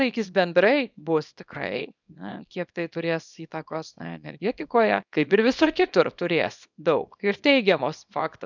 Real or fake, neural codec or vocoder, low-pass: fake; codec, 24 kHz, 0.9 kbps, WavTokenizer, small release; 7.2 kHz